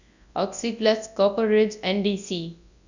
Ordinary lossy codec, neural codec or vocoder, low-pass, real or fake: none; codec, 24 kHz, 0.9 kbps, WavTokenizer, large speech release; 7.2 kHz; fake